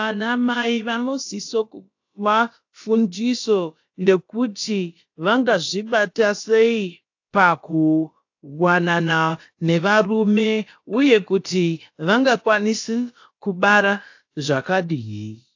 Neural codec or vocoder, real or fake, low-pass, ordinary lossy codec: codec, 16 kHz, about 1 kbps, DyCAST, with the encoder's durations; fake; 7.2 kHz; AAC, 48 kbps